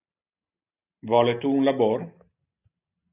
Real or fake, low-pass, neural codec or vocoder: real; 3.6 kHz; none